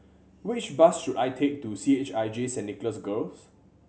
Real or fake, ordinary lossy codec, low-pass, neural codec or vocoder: real; none; none; none